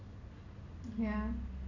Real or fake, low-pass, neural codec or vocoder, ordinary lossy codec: real; 7.2 kHz; none; none